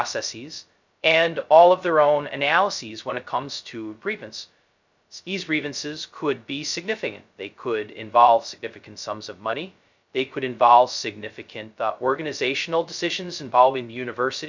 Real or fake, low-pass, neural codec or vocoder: fake; 7.2 kHz; codec, 16 kHz, 0.2 kbps, FocalCodec